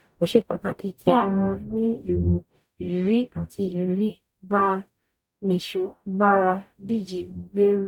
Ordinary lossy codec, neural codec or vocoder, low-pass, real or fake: none; codec, 44.1 kHz, 0.9 kbps, DAC; 19.8 kHz; fake